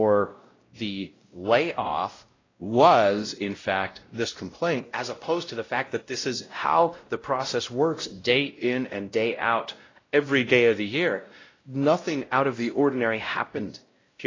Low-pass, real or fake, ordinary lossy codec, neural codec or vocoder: 7.2 kHz; fake; AAC, 32 kbps; codec, 16 kHz, 0.5 kbps, X-Codec, WavLM features, trained on Multilingual LibriSpeech